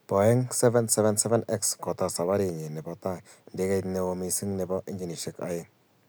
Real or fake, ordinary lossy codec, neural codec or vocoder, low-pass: real; none; none; none